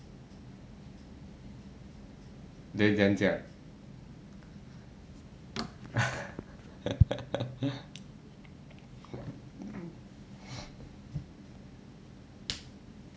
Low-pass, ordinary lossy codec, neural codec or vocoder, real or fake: none; none; none; real